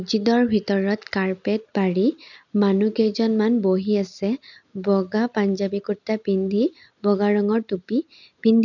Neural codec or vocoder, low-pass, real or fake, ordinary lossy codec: none; 7.2 kHz; real; none